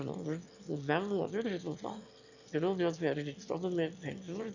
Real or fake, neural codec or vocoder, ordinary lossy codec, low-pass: fake; autoencoder, 22.05 kHz, a latent of 192 numbers a frame, VITS, trained on one speaker; none; 7.2 kHz